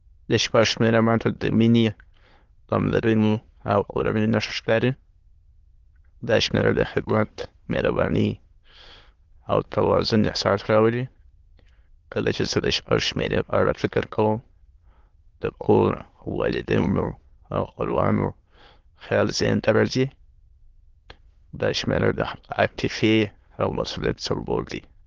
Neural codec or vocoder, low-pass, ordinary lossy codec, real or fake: autoencoder, 22.05 kHz, a latent of 192 numbers a frame, VITS, trained on many speakers; 7.2 kHz; Opus, 16 kbps; fake